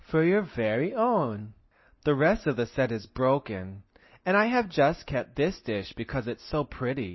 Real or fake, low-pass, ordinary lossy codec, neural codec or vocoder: real; 7.2 kHz; MP3, 24 kbps; none